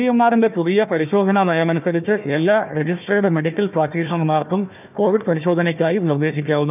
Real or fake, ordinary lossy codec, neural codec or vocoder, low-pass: fake; none; codec, 16 kHz, 1 kbps, FunCodec, trained on Chinese and English, 50 frames a second; 3.6 kHz